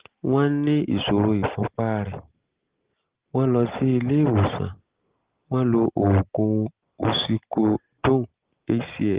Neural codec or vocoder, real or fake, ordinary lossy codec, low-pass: none; real; Opus, 16 kbps; 3.6 kHz